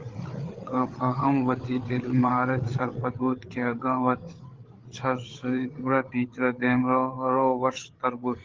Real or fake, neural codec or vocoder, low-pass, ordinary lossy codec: fake; codec, 16 kHz, 8 kbps, FreqCodec, larger model; 7.2 kHz; Opus, 16 kbps